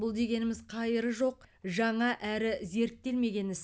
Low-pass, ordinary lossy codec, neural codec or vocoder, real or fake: none; none; none; real